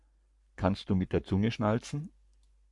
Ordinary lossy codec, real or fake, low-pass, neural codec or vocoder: AAC, 64 kbps; fake; 10.8 kHz; codec, 44.1 kHz, 7.8 kbps, Pupu-Codec